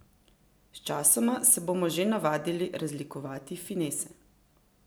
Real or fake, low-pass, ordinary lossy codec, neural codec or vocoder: real; none; none; none